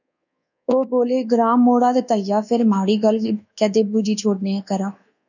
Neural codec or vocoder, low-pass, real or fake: codec, 24 kHz, 1.2 kbps, DualCodec; 7.2 kHz; fake